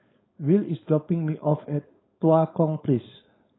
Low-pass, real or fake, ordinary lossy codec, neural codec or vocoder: 7.2 kHz; fake; AAC, 16 kbps; codec, 16 kHz, 4.8 kbps, FACodec